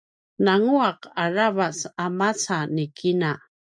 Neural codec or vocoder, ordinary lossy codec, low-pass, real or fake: none; MP3, 96 kbps; 9.9 kHz; real